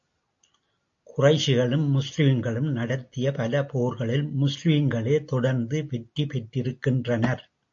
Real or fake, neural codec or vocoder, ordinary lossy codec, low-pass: real; none; AAC, 48 kbps; 7.2 kHz